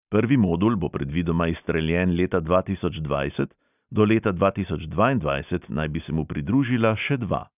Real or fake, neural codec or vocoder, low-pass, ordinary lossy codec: real; none; 3.6 kHz; none